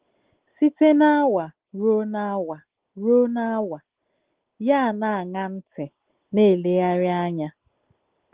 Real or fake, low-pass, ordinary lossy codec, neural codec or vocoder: real; 3.6 kHz; Opus, 16 kbps; none